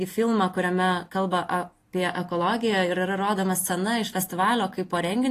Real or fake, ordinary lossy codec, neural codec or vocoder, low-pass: real; AAC, 48 kbps; none; 14.4 kHz